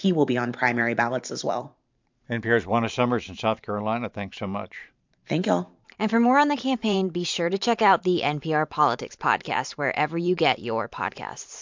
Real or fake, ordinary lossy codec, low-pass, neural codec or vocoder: fake; MP3, 64 kbps; 7.2 kHz; vocoder, 44.1 kHz, 128 mel bands every 512 samples, BigVGAN v2